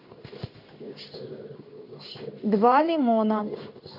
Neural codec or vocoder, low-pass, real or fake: codec, 16 kHz in and 24 kHz out, 1 kbps, XY-Tokenizer; 5.4 kHz; fake